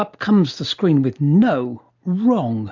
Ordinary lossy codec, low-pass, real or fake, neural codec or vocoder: MP3, 64 kbps; 7.2 kHz; real; none